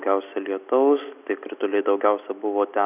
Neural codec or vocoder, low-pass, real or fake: none; 3.6 kHz; real